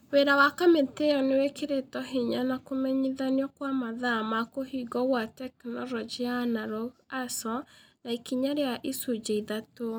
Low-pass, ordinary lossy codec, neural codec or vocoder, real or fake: none; none; none; real